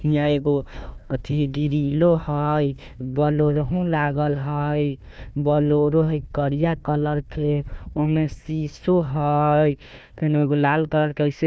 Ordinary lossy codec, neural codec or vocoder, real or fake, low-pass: none; codec, 16 kHz, 1 kbps, FunCodec, trained on Chinese and English, 50 frames a second; fake; none